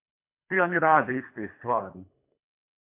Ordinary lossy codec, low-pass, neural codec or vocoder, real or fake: MP3, 32 kbps; 3.6 kHz; codec, 24 kHz, 3 kbps, HILCodec; fake